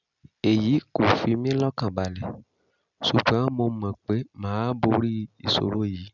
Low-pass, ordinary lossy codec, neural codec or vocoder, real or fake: 7.2 kHz; none; none; real